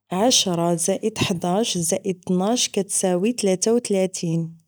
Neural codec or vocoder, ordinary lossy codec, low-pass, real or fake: none; none; none; real